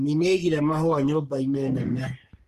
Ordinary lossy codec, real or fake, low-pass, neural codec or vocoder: Opus, 24 kbps; fake; 14.4 kHz; codec, 44.1 kHz, 3.4 kbps, Pupu-Codec